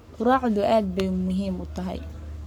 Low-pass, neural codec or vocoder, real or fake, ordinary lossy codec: 19.8 kHz; codec, 44.1 kHz, 7.8 kbps, Pupu-Codec; fake; none